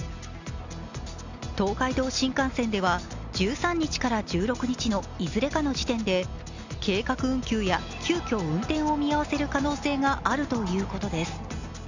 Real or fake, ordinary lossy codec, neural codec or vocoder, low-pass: real; Opus, 64 kbps; none; 7.2 kHz